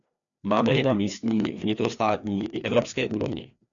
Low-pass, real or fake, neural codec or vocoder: 7.2 kHz; fake; codec, 16 kHz, 2 kbps, FreqCodec, larger model